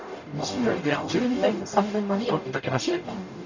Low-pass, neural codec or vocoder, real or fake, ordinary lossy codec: 7.2 kHz; codec, 44.1 kHz, 0.9 kbps, DAC; fake; none